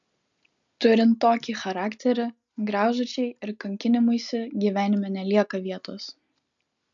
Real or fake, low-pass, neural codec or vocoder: real; 7.2 kHz; none